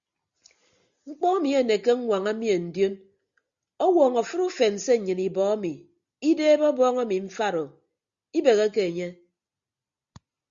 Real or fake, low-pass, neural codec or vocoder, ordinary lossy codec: real; 7.2 kHz; none; Opus, 64 kbps